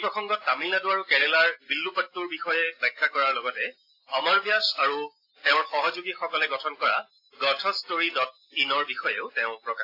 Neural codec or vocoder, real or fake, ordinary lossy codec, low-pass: none; real; AAC, 32 kbps; 5.4 kHz